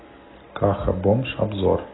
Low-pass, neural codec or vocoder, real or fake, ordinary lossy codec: 7.2 kHz; none; real; AAC, 16 kbps